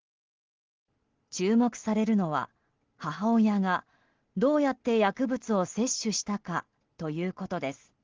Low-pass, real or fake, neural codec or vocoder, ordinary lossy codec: 7.2 kHz; real; none; Opus, 16 kbps